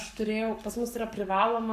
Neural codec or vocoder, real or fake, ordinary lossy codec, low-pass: codec, 44.1 kHz, 7.8 kbps, Pupu-Codec; fake; AAC, 96 kbps; 14.4 kHz